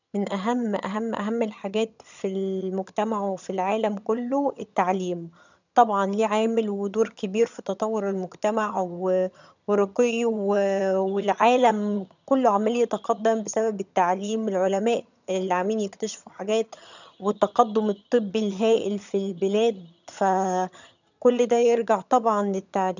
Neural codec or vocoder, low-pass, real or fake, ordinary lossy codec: vocoder, 22.05 kHz, 80 mel bands, HiFi-GAN; 7.2 kHz; fake; none